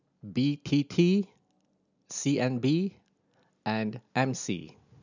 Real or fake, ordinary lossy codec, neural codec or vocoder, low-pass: real; none; none; 7.2 kHz